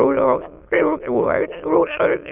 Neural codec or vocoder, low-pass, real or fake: autoencoder, 22.05 kHz, a latent of 192 numbers a frame, VITS, trained on many speakers; 3.6 kHz; fake